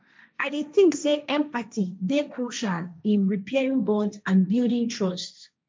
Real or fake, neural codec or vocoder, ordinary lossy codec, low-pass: fake; codec, 16 kHz, 1.1 kbps, Voila-Tokenizer; none; none